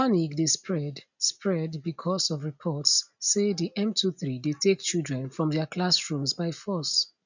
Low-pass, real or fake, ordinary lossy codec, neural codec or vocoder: 7.2 kHz; real; none; none